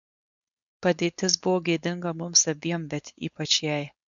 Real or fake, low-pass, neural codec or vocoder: fake; 7.2 kHz; codec, 16 kHz, 4.8 kbps, FACodec